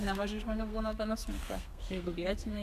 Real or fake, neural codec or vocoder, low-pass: fake; codec, 32 kHz, 1.9 kbps, SNAC; 14.4 kHz